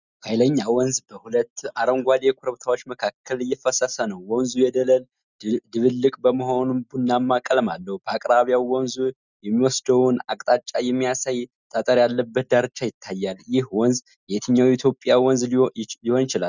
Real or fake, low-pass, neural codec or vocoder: real; 7.2 kHz; none